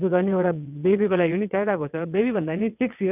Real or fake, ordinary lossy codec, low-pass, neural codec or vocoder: fake; none; 3.6 kHz; vocoder, 22.05 kHz, 80 mel bands, WaveNeXt